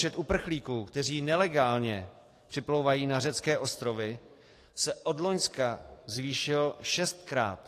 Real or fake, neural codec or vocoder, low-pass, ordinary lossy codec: fake; codec, 44.1 kHz, 7.8 kbps, DAC; 14.4 kHz; AAC, 48 kbps